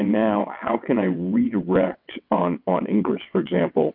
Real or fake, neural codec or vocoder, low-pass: fake; vocoder, 22.05 kHz, 80 mel bands, WaveNeXt; 5.4 kHz